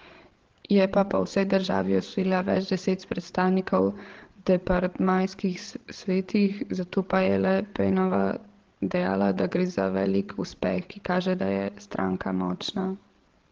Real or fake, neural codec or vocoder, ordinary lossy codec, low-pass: fake; codec, 16 kHz, 16 kbps, FreqCodec, larger model; Opus, 16 kbps; 7.2 kHz